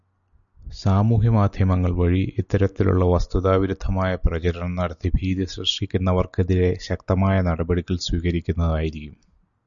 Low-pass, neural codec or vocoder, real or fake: 7.2 kHz; none; real